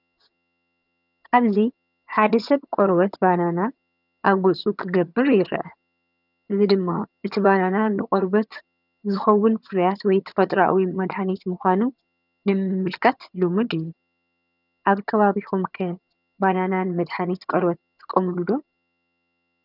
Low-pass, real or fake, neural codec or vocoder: 5.4 kHz; fake; vocoder, 22.05 kHz, 80 mel bands, HiFi-GAN